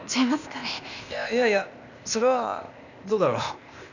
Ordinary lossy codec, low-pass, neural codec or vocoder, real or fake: none; 7.2 kHz; codec, 16 kHz, 0.8 kbps, ZipCodec; fake